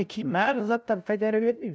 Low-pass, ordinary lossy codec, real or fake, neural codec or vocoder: none; none; fake; codec, 16 kHz, 0.5 kbps, FunCodec, trained on LibriTTS, 25 frames a second